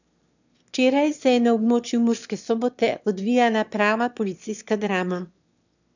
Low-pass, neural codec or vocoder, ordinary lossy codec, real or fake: 7.2 kHz; autoencoder, 22.05 kHz, a latent of 192 numbers a frame, VITS, trained on one speaker; none; fake